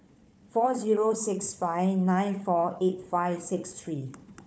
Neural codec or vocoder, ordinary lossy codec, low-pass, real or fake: codec, 16 kHz, 4 kbps, FunCodec, trained on Chinese and English, 50 frames a second; none; none; fake